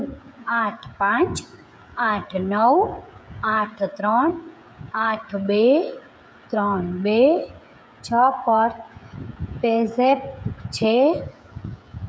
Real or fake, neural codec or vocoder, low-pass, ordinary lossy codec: fake; codec, 16 kHz, 16 kbps, FreqCodec, smaller model; none; none